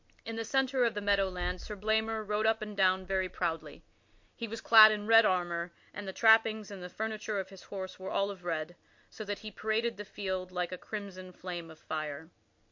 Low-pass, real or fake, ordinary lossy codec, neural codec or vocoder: 7.2 kHz; real; MP3, 48 kbps; none